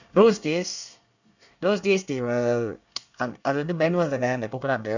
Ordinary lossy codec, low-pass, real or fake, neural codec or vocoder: none; 7.2 kHz; fake; codec, 24 kHz, 1 kbps, SNAC